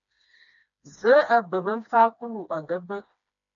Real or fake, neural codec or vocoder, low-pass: fake; codec, 16 kHz, 2 kbps, FreqCodec, smaller model; 7.2 kHz